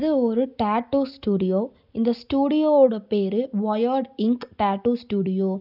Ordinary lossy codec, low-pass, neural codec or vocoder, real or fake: none; 5.4 kHz; none; real